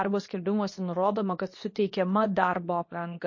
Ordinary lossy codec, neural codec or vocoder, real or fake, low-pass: MP3, 32 kbps; codec, 24 kHz, 0.9 kbps, WavTokenizer, medium speech release version 2; fake; 7.2 kHz